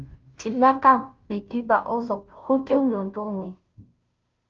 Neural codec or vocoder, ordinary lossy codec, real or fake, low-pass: codec, 16 kHz, 0.5 kbps, FunCodec, trained on Chinese and English, 25 frames a second; Opus, 24 kbps; fake; 7.2 kHz